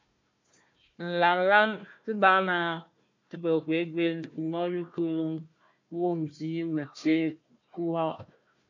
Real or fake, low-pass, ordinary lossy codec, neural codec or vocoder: fake; 7.2 kHz; MP3, 64 kbps; codec, 16 kHz, 1 kbps, FunCodec, trained on Chinese and English, 50 frames a second